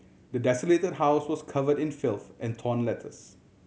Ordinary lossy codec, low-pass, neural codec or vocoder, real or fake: none; none; none; real